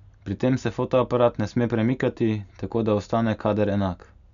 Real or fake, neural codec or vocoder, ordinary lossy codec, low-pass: real; none; none; 7.2 kHz